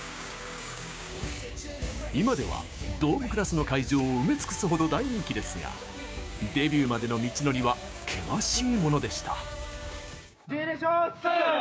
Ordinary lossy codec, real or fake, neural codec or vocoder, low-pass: none; fake; codec, 16 kHz, 6 kbps, DAC; none